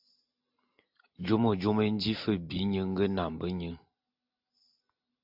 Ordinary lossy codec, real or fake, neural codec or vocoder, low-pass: AAC, 48 kbps; real; none; 5.4 kHz